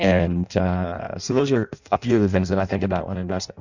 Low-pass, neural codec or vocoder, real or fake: 7.2 kHz; codec, 16 kHz in and 24 kHz out, 0.6 kbps, FireRedTTS-2 codec; fake